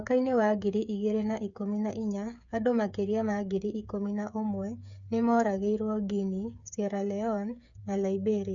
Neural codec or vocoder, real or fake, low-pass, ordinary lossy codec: codec, 16 kHz, 8 kbps, FreqCodec, smaller model; fake; 7.2 kHz; none